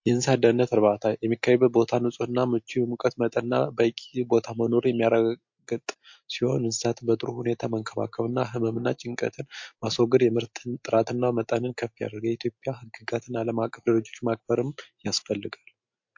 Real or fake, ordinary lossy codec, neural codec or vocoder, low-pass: real; MP3, 48 kbps; none; 7.2 kHz